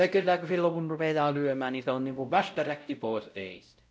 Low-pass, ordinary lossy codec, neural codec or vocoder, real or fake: none; none; codec, 16 kHz, 0.5 kbps, X-Codec, WavLM features, trained on Multilingual LibriSpeech; fake